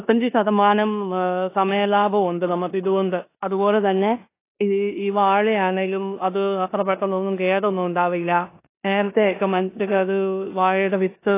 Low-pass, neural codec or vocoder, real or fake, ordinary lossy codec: 3.6 kHz; codec, 16 kHz in and 24 kHz out, 0.9 kbps, LongCat-Audio-Codec, four codebook decoder; fake; AAC, 24 kbps